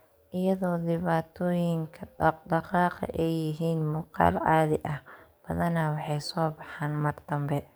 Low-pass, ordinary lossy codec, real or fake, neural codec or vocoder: none; none; fake; codec, 44.1 kHz, 7.8 kbps, DAC